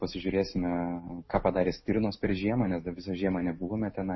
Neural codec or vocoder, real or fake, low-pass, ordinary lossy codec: none; real; 7.2 kHz; MP3, 24 kbps